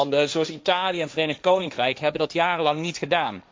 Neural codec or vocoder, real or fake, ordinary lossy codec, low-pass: codec, 16 kHz, 1.1 kbps, Voila-Tokenizer; fake; none; none